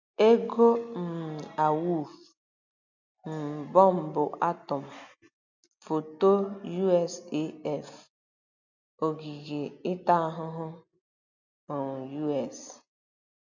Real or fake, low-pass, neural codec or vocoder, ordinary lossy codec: real; 7.2 kHz; none; none